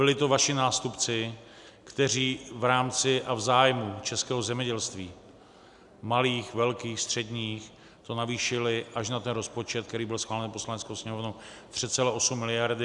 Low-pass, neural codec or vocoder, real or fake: 10.8 kHz; none; real